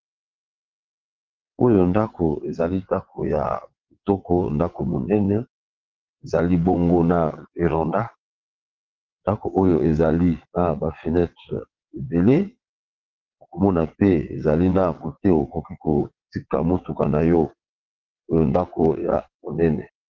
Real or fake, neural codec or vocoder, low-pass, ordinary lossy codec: fake; vocoder, 22.05 kHz, 80 mel bands, WaveNeXt; 7.2 kHz; Opus, 16 kbps